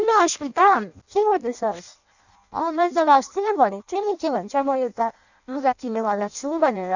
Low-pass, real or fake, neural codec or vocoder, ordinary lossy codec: 7.2 kHz; fake; codec, 16 kHz in and 24 kHz out, 0.6 kbps, FireRedTTS-2 codec; none